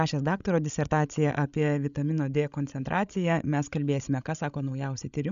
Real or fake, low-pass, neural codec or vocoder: fake; 7.2 kHz; codec, 16 kHz, 16 kbps, FreqCodec, larger model